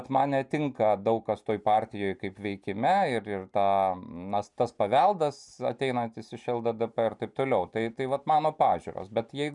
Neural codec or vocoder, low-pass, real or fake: none; 10.8 kHz; real